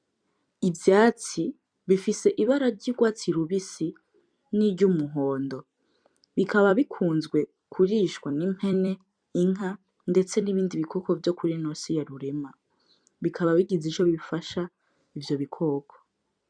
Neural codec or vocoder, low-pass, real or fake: vocoder, 44.1 kHz, 128 mel bands every 256 samples, BigVGAN v2; 9.9 kHz; fake